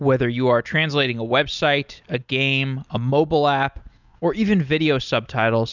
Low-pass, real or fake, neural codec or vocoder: 7.2 kHz; real; none